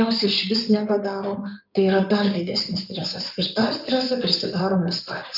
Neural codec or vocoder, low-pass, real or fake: codec, 16 kHz in and 24 kHz out, 2.2 kbps, FireRedTTS-2 codec; 5.4 kHz; fake